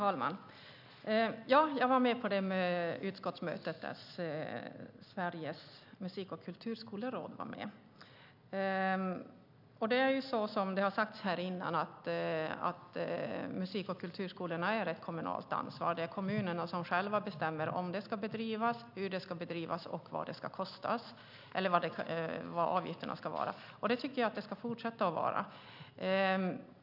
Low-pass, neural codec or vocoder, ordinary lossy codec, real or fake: 5.4 kHz; none; none; real